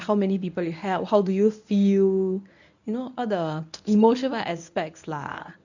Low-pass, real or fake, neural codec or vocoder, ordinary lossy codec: 7.2 kHz; fake; codec, 24 kHz, 0.9 kbps, WavTokenizer, medium speech release version 1; none